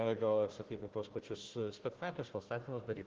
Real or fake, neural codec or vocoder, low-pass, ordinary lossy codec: fake; codec, 16 kHz, 1 kbps, FunCodec, trained on Chinese and English, 50 frames a second; 7.2 kHz; Opus, 16 kbps